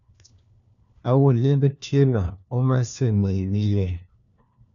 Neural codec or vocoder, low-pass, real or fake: codec, 16 kHz, 1 kbps, FunCodec, trained on LibriTTS, 50 frames a second; 7.2 kHz; fake